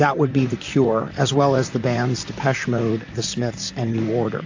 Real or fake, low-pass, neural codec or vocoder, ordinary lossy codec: fake; 7.2 kHz; vocoder, 44.1 kHz, 128 mel bands, Pupu-Vocoder; AAC, 48 kbps